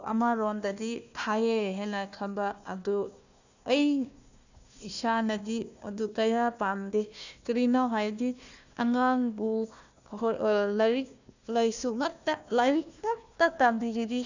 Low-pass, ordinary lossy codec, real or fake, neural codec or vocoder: 7.2 kHz; none; fake; codec, 16 kHz, 1 kbps, FunCodec, trained on Chinese and English, 50 frames a second